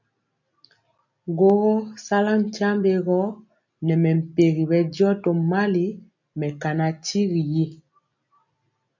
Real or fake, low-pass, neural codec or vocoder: real; 7.2 kHz; none